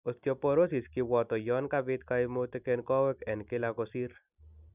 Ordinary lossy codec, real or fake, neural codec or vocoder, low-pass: none; real; none; 3.6 kHz